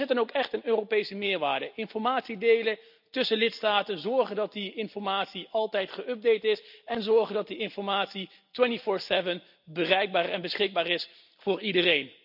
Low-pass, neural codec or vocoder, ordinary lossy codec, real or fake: 5.4 kHz; none; none; real